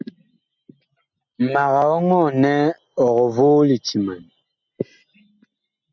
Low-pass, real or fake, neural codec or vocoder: 7.2 kHz; real; none